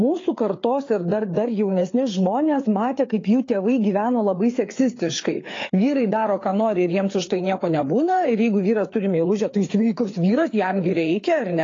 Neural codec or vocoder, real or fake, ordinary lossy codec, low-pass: codec, 16 kHz, 4 kbps, FreqCodec, larger model; fake; AAC, 32 kbps; 7.2 kHz